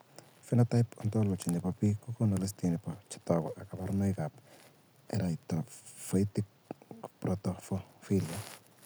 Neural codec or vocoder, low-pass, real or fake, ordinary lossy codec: none; none; real; none